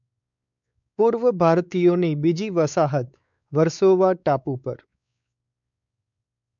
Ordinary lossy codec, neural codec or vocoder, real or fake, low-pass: none; codec, 16 kHz, 4 kbps, X-Codec, WavLM features, trained on Multilingual LibriSpeech; fake; 7.2 kHz